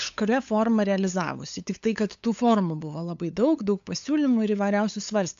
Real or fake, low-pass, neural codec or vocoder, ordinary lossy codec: fake; 7.2 kHz; codec, 16 kHz, 8 kbps, FunCodec, trained on LibriTTS, 25 frames a second; AAC, 64 kbps